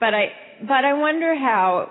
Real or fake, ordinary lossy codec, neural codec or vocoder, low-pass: real; AAC, 16 kbps; none; 7.2 kHz